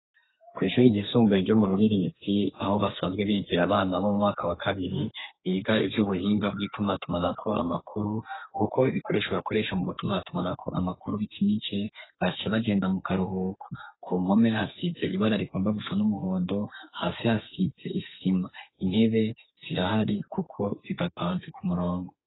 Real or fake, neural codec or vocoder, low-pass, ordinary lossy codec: fake; codec, 32 kHz, 1.9 kbps, SNAC; 7.2 kHz; AAC, 16 kbps